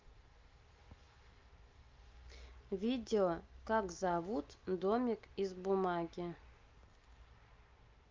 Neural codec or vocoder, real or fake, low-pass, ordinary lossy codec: none; real; 7.2 kHz; Opus, 24 kbps